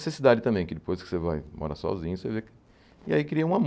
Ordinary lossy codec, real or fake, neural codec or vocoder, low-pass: none; real; none; none